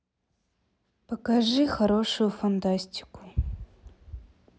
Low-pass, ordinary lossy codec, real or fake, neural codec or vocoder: none; none; real; none